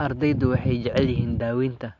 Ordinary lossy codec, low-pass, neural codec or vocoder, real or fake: AAC, 48 kbps; 7.2 kHz; none; real